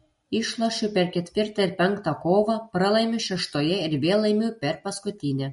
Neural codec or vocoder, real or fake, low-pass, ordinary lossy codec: none; real; 19.8 kHz; MP3, 48 kbps